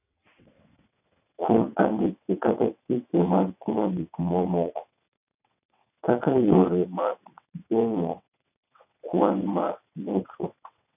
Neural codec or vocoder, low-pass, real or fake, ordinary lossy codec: vocoder, 22.05 kHz, 80 mel bands, WaveNeXt; 3.6 kHz; fake; none